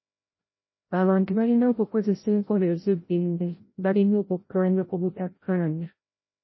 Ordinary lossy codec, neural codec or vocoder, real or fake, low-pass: MP3, 24 kbps; codec, 16 kHz, 0.5 kbps, FreqCodec, larger model; fake; 7.2 kHz